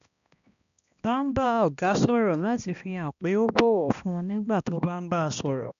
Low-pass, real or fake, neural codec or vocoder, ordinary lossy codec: 7.2 kHz; fake; codec, 16 kHz, 1 kbps, X-Codec, HuBERT features, trained on balanced general audio; none